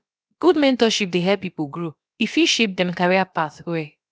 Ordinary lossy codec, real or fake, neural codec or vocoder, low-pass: none; fake; codec, 16 kHz, about 1 kbps, DyCAST, with the encoder's durations; none